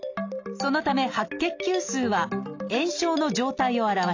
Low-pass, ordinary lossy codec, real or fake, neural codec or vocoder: 7.2 kHz; AAC, 48 kbps; real; none